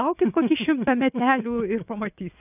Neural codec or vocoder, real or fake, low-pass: vocoder, 24 kHz, 100 mel bands, Vocos; fake; 3.6 kHz